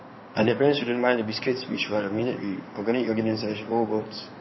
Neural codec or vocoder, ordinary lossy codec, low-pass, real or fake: codec, 16 kHz in and 24 kHz out, 2.2 kbps, FireRedTTS-2 codec; MP3, 24 kbps; 7.2 kHz; fake